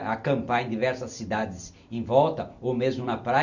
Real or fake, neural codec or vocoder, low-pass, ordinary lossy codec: real; none; 7.2 kHz; none